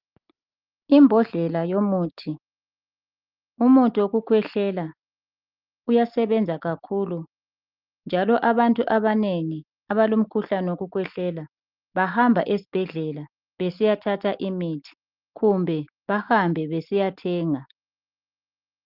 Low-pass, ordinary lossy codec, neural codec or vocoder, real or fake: 5.4 kHz; Opus, 24 kbps; none; real